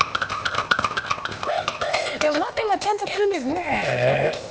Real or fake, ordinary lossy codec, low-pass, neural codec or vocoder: fake; none; none; codec, 16 kHz, 0.8 kbps, ZipCodec